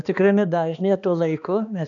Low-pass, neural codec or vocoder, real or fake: 7.2 kHz; codec, 16 kHz, 2 kbps, X-Codec, HuBERT features, trained on balanced general audio; fake